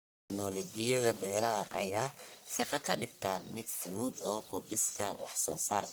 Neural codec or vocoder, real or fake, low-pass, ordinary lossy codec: codec, 44.1 kHz, 1.7 kbps, Pupu-Codec; fake; none; none